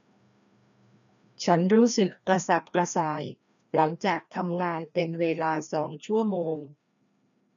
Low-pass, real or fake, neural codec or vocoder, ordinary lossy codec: 7.2 kHz; fake; codec, 16 kHz, 1 kbps, FreqCodec, larger model; none